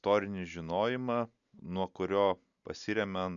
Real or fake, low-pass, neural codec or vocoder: real; 7.2 kHz; none